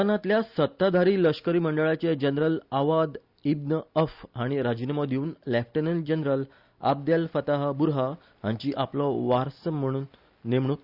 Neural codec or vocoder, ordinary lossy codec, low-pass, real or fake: none; Opus, 64 kbps; 5.4 kHz; real